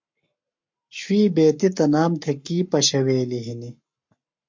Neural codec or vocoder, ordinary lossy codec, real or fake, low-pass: codec, 44.1 kHz, 7.8 kbps, Pupu-Codec; MP3, 48 kbps; fake; 7.2 kHz